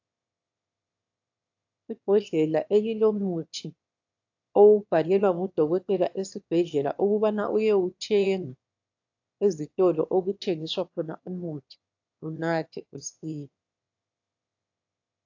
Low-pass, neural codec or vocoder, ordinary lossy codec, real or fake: 7.2 kHz; autoencoder, 22.05 kHz, a latent of 192 numbers a frame, VITS, trained on one speaker; AAC, 48 kbps; fake